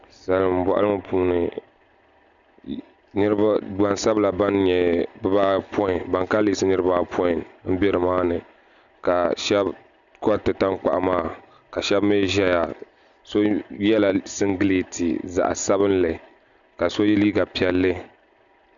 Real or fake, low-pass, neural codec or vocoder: real; 7.2 kHz; none